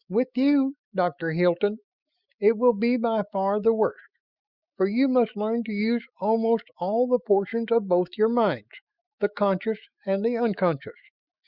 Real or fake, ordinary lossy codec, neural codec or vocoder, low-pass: fake; Opus, 64 kbps; autoencoder, 48 kHz, 128 numbers a frame, DAC-VAE, trained on Japanese speech; 5.4 kHz